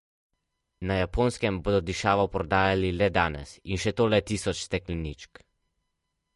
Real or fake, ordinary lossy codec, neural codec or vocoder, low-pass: real; MP3, 48 kbps; none; 14.4 kHz